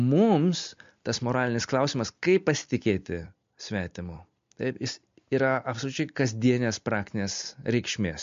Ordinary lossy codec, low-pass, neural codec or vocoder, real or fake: MP3, 48 kbps; 7.2 kHz; none; real